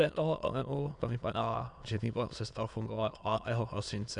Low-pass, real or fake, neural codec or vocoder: 9.9 kHz; fake; autoencoder, 22.05 kHz, a latent of 192 numbers a frame, VITS, trained on many speakers